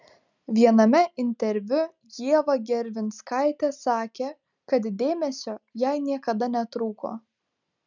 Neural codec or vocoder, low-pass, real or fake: none; 7.2 kHz; real